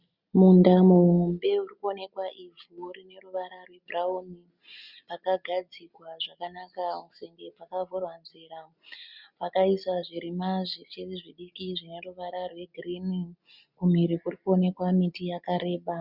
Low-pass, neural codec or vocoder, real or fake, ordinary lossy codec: 5.4 kHz; none; real; Opus, 64 kbps